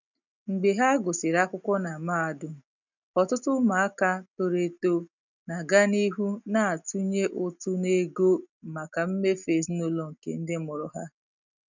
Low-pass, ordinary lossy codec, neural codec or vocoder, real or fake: 7.2 kHz; none; none; real